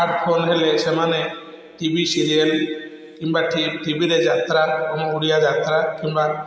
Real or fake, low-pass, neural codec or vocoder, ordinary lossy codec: real; none; none; none